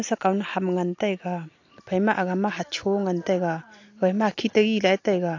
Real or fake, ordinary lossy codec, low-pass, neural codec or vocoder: real; AAC, 48 kbps; 7.2 kHz; none